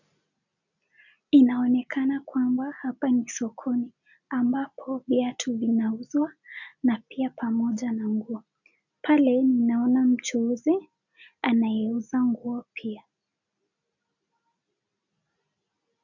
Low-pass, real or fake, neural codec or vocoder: 7.2 kHz; real; none